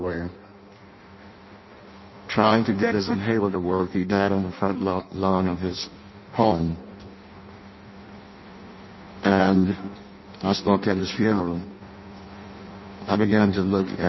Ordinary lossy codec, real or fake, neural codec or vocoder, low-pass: MP3, 24 kbps; fake; codec, 16 kHz in and 24 kHz out, 0.6 kbps, FireRedTTS-2 codec; 7.2 kHz